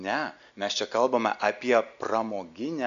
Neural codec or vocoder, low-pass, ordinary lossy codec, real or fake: none; 7.2 kHz; AAC, 96 kbps; real